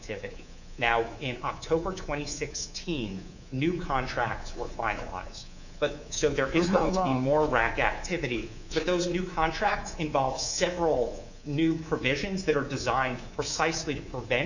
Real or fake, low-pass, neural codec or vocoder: fake; 7.2 kHz; codec, 24 kHz, 3.1 kbps, DualCodec